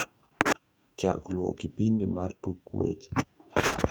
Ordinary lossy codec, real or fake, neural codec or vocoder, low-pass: none; fake; codec, 44.1 kHz, 2.6 kbps, DAC; none